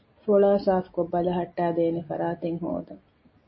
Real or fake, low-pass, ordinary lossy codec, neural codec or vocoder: real; 7.2 kHz; MP3, 24 kbps; none